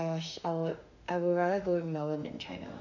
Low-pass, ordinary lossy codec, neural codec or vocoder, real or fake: 7.2 kHz; none; autoencoder, 48 kHz, 32 numbers a frame, DAC-VAE, trained on Japanese speech; fake